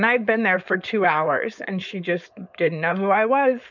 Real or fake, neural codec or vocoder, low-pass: fake; codec, 16 kHz, 4.8 kbps, FACodec; 7.2 kHz